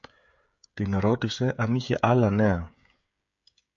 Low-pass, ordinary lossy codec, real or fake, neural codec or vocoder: 7.2 kHz; MP3, 64 kbps; fake; codec, 16 kHz, 16 kbps, FreqCodec, smaller model